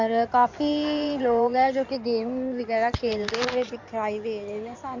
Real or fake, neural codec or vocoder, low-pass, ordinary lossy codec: fake; codec, 16 kHz in and 24 kHz out, 2.2 kbps, FireRedTTS-2 codec; 7.2 kHz; MP3, 64 kbps